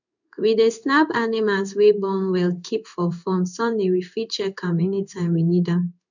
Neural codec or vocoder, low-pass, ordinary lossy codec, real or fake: codec, 16 kHz in and 24 kHz out, 1 kbps, XY-Tokenizer; 7.2 kHz; none; fake